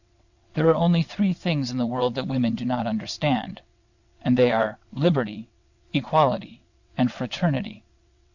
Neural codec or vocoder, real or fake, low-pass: vocoder, 22.05 kHz, 80 mel bands, WaveNeXt; fake; 7.2 kHz